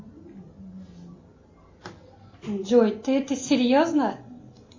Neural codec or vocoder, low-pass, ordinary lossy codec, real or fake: none; 7.2 kHz; MP3, 32 kbps; real